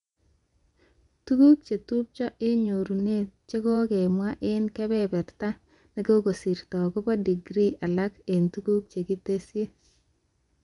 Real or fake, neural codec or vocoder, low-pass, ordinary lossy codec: fake; vocoder, 24 kHz, 100 mel bands, Vocos; 10.8 kHz; none